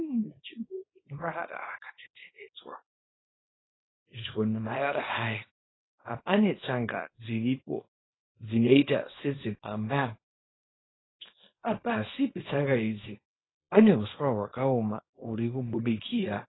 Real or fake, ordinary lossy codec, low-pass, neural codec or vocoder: fake; AAC, 16 kbps; 7.2 kHz; codec, 24 kHz, 0.9 kbps, WavTokenizer, small release